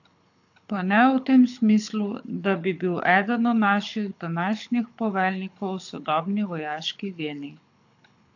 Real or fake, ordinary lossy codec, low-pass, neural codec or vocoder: fake; AAC, 48 kbps; 7.2 kHz; codec, 24 kHz, 6 kbps, HILCodec